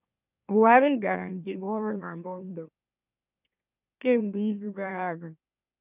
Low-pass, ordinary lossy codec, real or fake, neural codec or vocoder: 3.6 kHz; none; fake; autoencoder, 44.1 kHz, a latent of 192 numbers a frame, MeloTTS